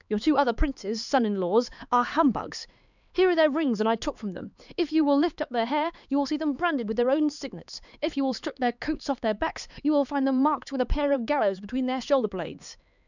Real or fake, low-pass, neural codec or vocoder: fake; 7.2 kHz; codec, 16 kHz, 4 kbps, X-Codec, HuBERT features, trained on LibriSpeech